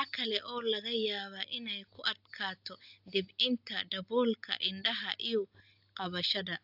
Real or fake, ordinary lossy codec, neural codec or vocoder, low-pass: real; AAC, 48 kbps; none; 5.4 kHz